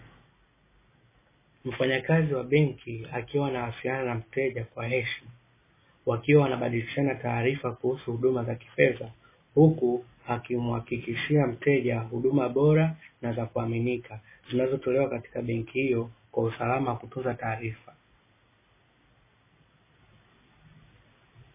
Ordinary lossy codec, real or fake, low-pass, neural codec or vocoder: MP3, 16 kbps; real; 3.6 kHz; none